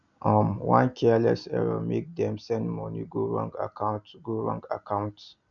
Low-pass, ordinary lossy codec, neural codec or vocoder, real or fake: 7.2 kHz; none; none; real